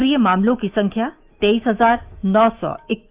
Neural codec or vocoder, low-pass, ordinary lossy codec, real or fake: autoencoder, 48 kHz, 128 numbers a frame, DAC-VAE, trained on Japanese speech; 3.6 kHz; Opus, 32 kbps; fake